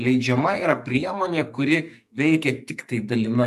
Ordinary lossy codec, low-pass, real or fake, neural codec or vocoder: MP3, 96 kbps; 14.4 kHz; fake; codec, 44.1 kHz, 2.6 kbps, SNAC